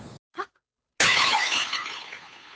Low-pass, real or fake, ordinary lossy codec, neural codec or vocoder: none; fake; none; codec, 16 kHz, 2 kbps, FunCodec, trained on Chinese and English, 25 frames a second